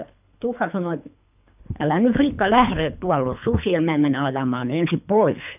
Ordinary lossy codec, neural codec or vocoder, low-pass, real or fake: none; codec, 24 kHz, 3 kbps, HILCodec; 3.6 kHz; fake